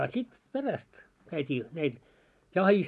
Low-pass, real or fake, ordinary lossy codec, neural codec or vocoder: none; real; none; none